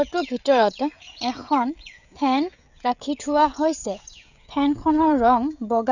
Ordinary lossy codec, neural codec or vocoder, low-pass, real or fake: none; codec, 16 kHz, 16 kbps, FreqCodec, smaller model; 7.2 kHz; fake